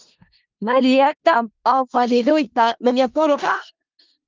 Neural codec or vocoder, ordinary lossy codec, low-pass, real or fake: codec, 16 kHz in and 24 kHz out, 0.4 kbps, LongCat-Audio-Codec, four codebook decoder; Opus, 32 kbps; 7.2 kHz; fake